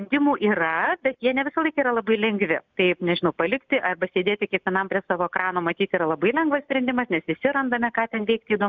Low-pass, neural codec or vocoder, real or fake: 7.2 kHz; none; real